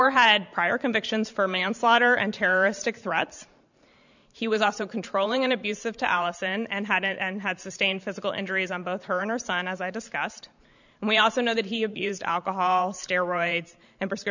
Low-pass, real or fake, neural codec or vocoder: 7.2 kHz; fake; vocoder, 44.1 kHz, 128 mel bands every 512 samples, BigVGAN v2